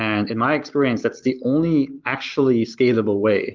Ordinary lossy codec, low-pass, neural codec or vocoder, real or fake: Opus, 32 kbps; 7.2 kHz; none; real